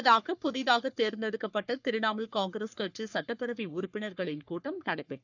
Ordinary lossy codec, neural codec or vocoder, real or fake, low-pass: none; codec, 44.1 kHz, 3.4 kbps, Pupu-Codec; fake; 7.2 kHz